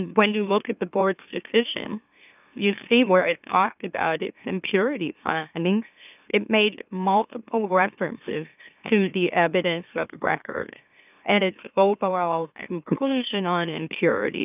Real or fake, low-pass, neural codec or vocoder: fake; 3.6 kHz; autoencoder, 44.1 kHz, a latent of 192 numbers a frame, MeloTTS